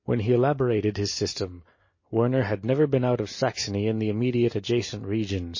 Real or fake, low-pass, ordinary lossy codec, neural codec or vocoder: real; 7.2 kHz; MP3, 32 kbps; none